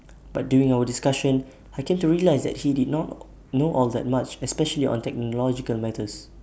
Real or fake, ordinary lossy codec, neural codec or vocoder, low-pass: real; none; none; none